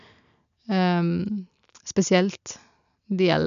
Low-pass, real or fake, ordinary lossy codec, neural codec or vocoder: 7.2 kHz; real; none; none